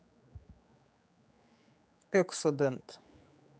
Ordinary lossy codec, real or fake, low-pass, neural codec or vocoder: none; fake; none; codec, 16 kHz, 4 kbps, X-Codec, HuBERT features, trained on general audio